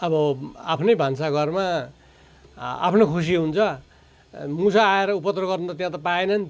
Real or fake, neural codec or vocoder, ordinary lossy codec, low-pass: real; none; none; none